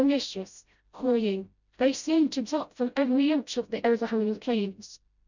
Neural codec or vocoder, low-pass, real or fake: codec, 16 kHz, 0.5 kbps, FreqCodec, smaller model; 7.2 kHz; fake